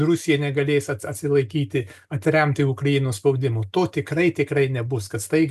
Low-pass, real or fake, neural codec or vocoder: 14.4 kHz; real; none